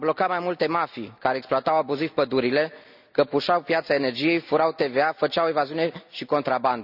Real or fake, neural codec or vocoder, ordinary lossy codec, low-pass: real; none; none; 5.4 kHz